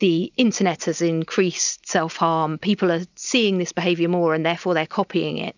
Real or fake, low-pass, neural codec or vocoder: real; 7.2 kHz; none